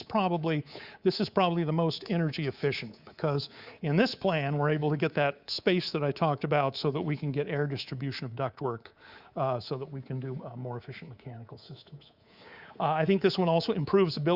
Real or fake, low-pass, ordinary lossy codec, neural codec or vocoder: fake; 5.4 kHz; Opus, 64 kbps; codec, 24 kHz, 3.1 kbps, DualCodec